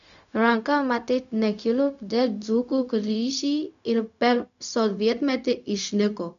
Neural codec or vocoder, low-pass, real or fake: codec, 16 kHz, 0.4 kbps, LongCat-Audio-Codec; 7.2 kHz; fake